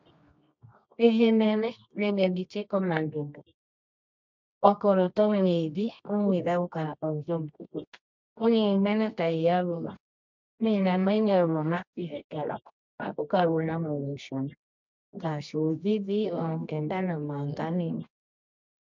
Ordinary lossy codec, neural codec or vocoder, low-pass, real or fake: MP3, 64 kbps; codec, 24 kHz, 0.9 kbps, WavTokenizer, medium music audio release; 7.2 kHz; fake